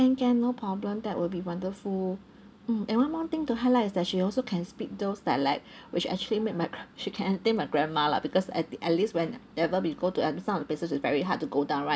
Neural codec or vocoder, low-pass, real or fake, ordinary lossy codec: none; none; real; none